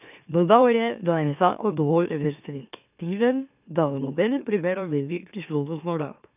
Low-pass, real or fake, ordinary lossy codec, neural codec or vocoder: 3.6 kHz; fake; none; autoencoder, 44.1 kHz, a latent of 192 numbers a frame, MeloTTS